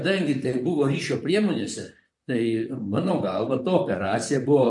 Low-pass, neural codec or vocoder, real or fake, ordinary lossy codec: 10.8 kHz; vocoder, 44.1 kHz, 128 mel bands, Pupu-Vocoder; fake; MP3, 48 kbps